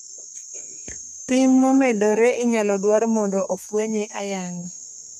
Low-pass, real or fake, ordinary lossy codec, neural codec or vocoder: 14.4 kHz; fake; none; codec, 32 kHz, 1.9 kbps, SNAC